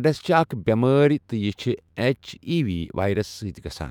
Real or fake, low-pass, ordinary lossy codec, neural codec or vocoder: fake; 19.8 kHz; none; autoencoder, 48 kHz, 128 numbers a frame, DAC-VAE, trained on Japanese speech